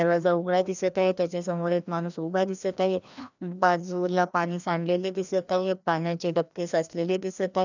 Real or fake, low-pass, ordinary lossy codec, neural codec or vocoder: fake; 7.2 kHz; none; codec, 16 kHz, 1 kbps, FreqCodec, larger model